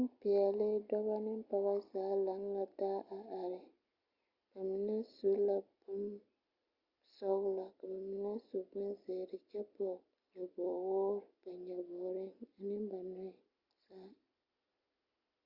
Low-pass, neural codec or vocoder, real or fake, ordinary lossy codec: 5.4 kHz; none; real; Opus, 64 kbps